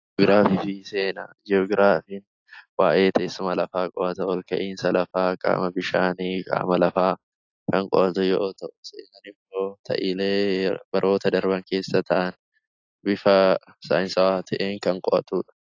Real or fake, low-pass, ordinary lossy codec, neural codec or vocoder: real; 7.2 kHz; AAC, 48 kbps; none